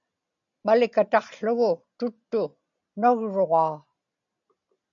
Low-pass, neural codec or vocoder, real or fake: 7.2 kHz; none; real